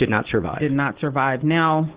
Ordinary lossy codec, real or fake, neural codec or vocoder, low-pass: Opus, 16 kbps; real; none; 3.6 kHz